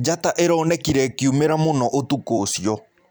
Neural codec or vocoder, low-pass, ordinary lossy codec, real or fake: vocoder, 44.1 kHz, 128 mel bands every 256 samples, BigVGAN v2; none; none; fake